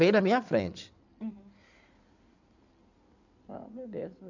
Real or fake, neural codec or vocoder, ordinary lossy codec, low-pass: fake; codec, 16 kHz in and 24 kHz out, 2.2 kbps, FireRedTTS-2 codec; none; 7.2 kHz